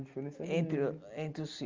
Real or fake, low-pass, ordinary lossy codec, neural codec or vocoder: real; 7.2 kHz; Opus, 32 kbps; none